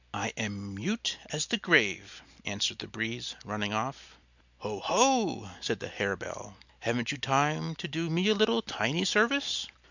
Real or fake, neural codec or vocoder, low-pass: real; none; 7.2 kHz